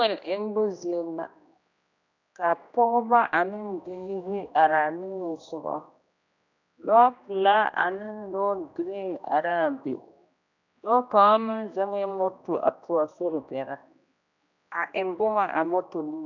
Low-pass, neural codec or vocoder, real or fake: 7.2 kHz; codec, 16 kHz, 1 kbps, X-Codec, HuBERT features, trained on general audio; fake